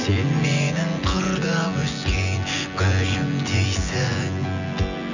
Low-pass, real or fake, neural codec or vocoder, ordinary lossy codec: 7.2 kHz; fake; vocoder, 24 kHz, 100 mel bands, Vocos; none